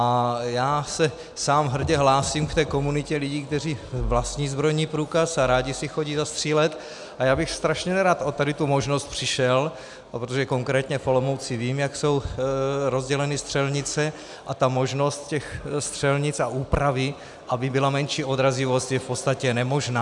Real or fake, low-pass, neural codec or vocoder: real; 10.8 kHz; none